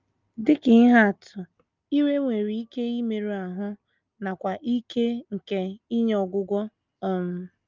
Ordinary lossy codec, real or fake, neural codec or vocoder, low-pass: Opus, 24 kbps; real; none; 7.2 kHz